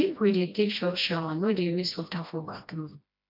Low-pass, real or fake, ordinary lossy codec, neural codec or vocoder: 5.4 kHz; fake; MP3, 48 kbps; codec, 16 kHz, 1 kbps, FreqCodec, smaller model